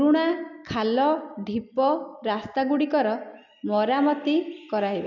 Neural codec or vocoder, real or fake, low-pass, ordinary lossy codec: none; real; 7.2 kHz; none